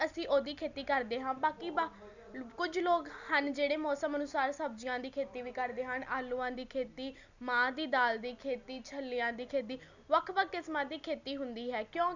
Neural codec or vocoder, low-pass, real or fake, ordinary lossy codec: none; 7.2 kHz; real; none